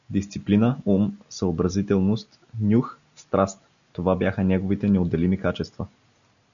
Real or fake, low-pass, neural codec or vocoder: real; 7.2 kHz; none